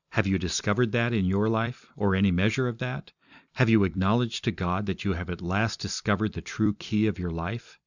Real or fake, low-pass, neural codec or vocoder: fake; 7.2 kHz; vocoder, 44.1 kHz, 128 mel bands every 256 samples, BigVGAN v2